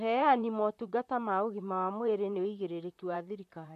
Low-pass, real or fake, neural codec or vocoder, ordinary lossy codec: 14.4 kHz; fake; vocoder, 44.1 kHz, 128 mel bands, Pupu-Vocoder; MP3, 64 kbps